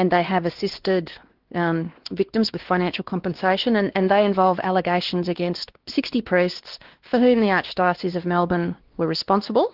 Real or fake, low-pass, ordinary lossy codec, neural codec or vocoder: fake; 5.4 kHz; Opus, 16 kbps; codec, 16 kHz, 2 kbps, X-Codec, WavLM features, trained on Multilingual LibriSpeech